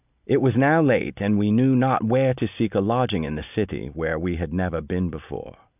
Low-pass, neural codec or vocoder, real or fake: 3.6 kHz; none; real